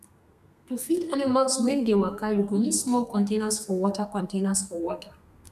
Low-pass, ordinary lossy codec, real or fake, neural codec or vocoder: 14.4 kHz; none; fake; codec, 32 kHz, 1.9 kbps, SNAC